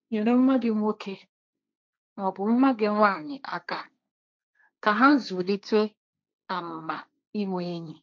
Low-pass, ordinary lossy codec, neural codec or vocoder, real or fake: none; none; codec, 16 kHz, 1.1 kbps, Voila-Tokenizer; fake